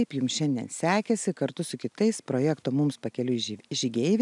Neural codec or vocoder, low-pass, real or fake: none; 10.8 kHz; real